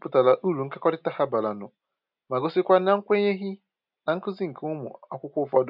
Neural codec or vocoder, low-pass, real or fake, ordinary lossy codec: none; 5.4 kHz; real; none